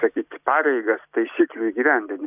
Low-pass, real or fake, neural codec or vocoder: 3.6 kHz; real; none